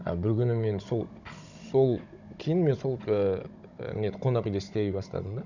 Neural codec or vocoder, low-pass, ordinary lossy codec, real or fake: codec, 16 kHz, 16 kbps, FunCodec, trained on Chinese and English, 50 frames a second; 7.2 kHz; none; fake